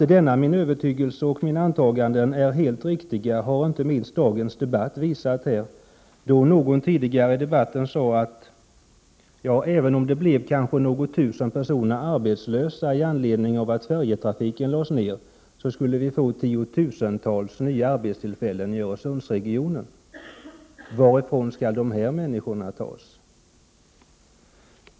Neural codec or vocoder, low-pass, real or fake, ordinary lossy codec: none; none; real; none